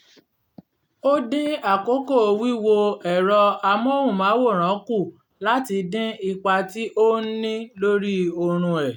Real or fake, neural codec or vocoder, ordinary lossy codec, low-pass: real; none; none; 19.8 kHz